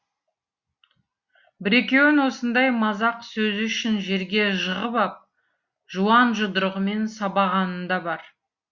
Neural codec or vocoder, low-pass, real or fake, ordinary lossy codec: none; 7.2 kHz; real; Opus, 64 kbps